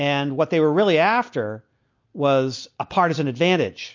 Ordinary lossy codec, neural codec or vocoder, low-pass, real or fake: MP3, 48 kbps; none; 7.2 kHz; real